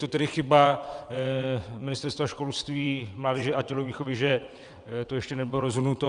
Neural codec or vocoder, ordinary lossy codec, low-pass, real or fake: vocoder, 22.05 kHz, 80 mel bands, WaveNeXt; Opus, 64 kbps; 9.9 kHz; fake